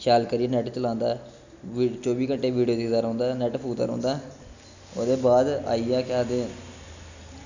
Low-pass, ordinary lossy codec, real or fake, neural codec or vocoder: 7.2 kHz; none; real; none